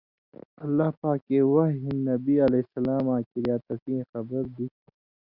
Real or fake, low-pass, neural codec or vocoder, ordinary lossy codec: real; 5.4 kHz; none; Opus, 64 kbps